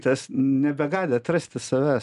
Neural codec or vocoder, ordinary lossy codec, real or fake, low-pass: none; MP3, 96 kbps; real; 10.8 kHz